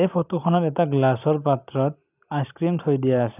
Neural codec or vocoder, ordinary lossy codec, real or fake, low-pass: none; none; real; 3.6 kHz